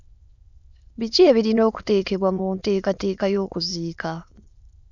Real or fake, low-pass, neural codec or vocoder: fake; 7.2 kHz; autoencoder, 22.05 kHz, a latent of 192 numbers a frame, VITS, trained on many speakers